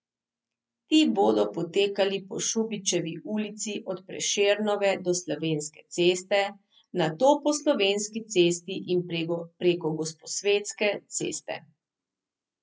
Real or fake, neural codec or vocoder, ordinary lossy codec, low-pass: real; none; none; none